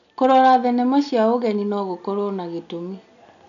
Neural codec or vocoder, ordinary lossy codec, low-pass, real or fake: none; none; 7.2 kHz; real